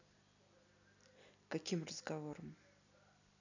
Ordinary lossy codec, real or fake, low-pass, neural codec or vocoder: none; real; 7.2 kHz; none